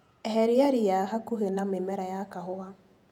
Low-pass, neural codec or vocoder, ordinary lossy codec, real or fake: 19.8 kHz; vocoder, 48 kHz, 128 mel bands, Vocos; none; fake